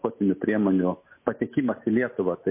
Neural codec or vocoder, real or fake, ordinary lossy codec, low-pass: none; real; MP3, 24 kbps; 3.6 kHz